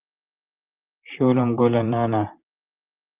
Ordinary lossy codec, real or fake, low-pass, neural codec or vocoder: Opus, 24 kbps; fake; 3.6 kHz; vocoder, 44.1 kHz, 128 mel bands, Pupu-Vocoder